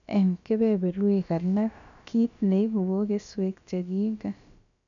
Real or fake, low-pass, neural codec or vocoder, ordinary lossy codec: fake; 7.2 kHz; codec, 16 kHz, about 1 kbps, DyCAST, with the encoder's durations; none